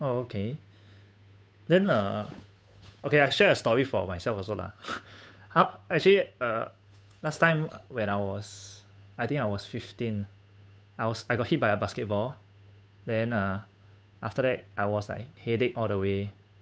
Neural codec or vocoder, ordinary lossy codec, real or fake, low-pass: codec, 16 kHz, 8 kbps, FunCodec, trained on Chinese and English, 25 frames a second; none; fake; none